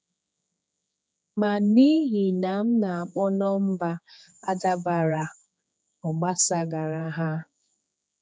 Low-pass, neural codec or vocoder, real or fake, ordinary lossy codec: none; codec, 16 kHz, 4 kbps, X-Codec, HuBERT features, trained on general audio; fake; none